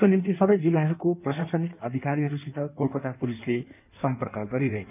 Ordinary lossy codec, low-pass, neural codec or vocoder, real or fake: none; 3.6 kHz; codec, 16 kHz in and 24 kHz out, 1.1 kbps, FireRedTTS-2 codec; fake